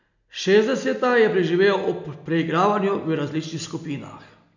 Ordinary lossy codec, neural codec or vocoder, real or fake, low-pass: none; none; real; 7.2 kHz